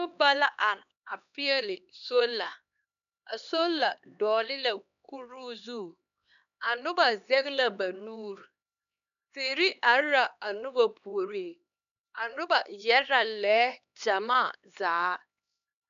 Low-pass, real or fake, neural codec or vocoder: 7.2 kHz; fake; codec, 16 kHz, 2 kbps, X-Codec, HuBERT features, trained on LibriSpeech